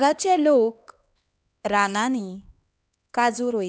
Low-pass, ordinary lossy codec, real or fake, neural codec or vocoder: none; none; fake; codec, 16 kHz, 2 kbps, X-Codec, HuBERT features, trained on LibriSpeech